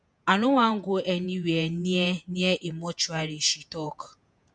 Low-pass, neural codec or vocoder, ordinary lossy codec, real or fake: 9.9 kHz; vocoder, 48 kHz, 128 mel bands, Vocos; none; fake